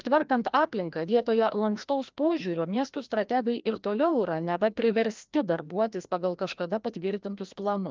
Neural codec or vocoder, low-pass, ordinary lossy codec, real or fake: codec, 16 kHz, 1 kbps, FreqCodec, larger model; 7.2 kHz; Opus, 24 kbps; fake